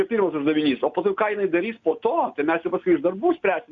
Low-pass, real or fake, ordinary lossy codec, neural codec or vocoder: 7.2 kHz; real; Opus, 64 kbps; none